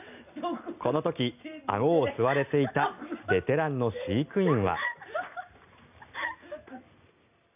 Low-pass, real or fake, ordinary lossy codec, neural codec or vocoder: 3.6 kHz; real; none; none